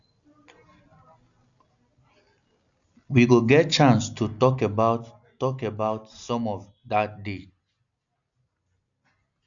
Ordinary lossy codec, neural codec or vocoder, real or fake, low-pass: none; none; real; 7.2 kHz